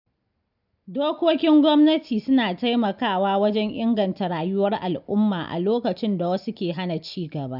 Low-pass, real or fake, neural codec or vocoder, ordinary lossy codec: 5.4 kHz; real; none; none